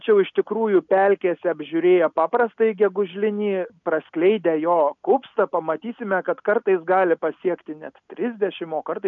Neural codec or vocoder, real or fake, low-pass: none; real; 7.2 kHz